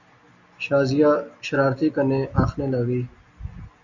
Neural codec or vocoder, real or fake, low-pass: none; real; 7.2 kHz